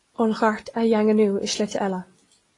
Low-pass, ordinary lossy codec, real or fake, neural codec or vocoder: 10.8 kHz; AAC, 32 kbps; real; none